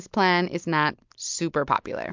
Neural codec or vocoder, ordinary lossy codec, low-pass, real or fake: none; MP3, 64 kbps; 7.2 kHz; real